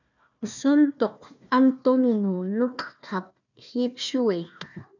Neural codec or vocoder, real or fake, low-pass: codec, 16 kHz, 1 kbps, FunCodec, trained on Chinese and English, 50 frames a second; fake; 7.2 kHz